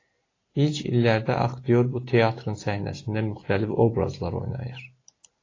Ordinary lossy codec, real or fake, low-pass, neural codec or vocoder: AAC, 32 kbps; real; 7.2 kHz; none